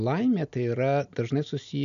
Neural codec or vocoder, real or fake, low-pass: none; real; 7.2 kHz